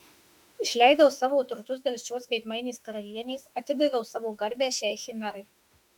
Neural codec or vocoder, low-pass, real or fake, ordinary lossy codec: autoencoder, 48 kHz, 32 numbers a frame, DAC-VAE, trained on Japanese speech; 19.8 kHz; fake; MP3, 96 kbps